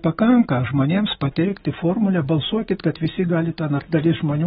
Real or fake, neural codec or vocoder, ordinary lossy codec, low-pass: real; none; AAC, 16 kbps; 7.2 kHz